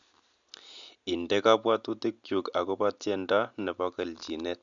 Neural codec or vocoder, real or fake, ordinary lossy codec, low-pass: none; real; MP3, 64 kbps; 7.2 kHz